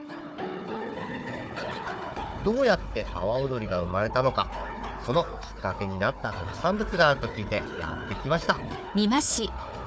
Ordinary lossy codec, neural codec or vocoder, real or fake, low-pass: none; codec, 16 kHz, 4 kbps, FunCodec, trained on Chinese and English, 50 frames a second; fake; none